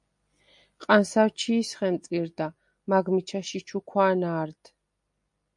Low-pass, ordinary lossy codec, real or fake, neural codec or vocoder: 10.8 kHz; MP3, 64 kbps; real; none